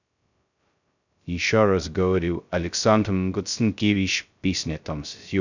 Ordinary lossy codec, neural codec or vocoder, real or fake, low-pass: none; codec, 16 kHz, 0.2 kbps, FocalCodec; fake; 7.2 kHz